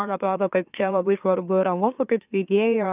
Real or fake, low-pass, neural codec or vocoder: fake; 3.6 kHz; autoencoder, 44.1 kHz, a latent of 192 numbers a frame, MeloTTS